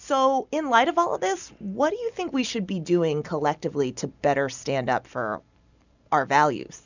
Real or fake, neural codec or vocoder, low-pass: real; none; 7.2 kHz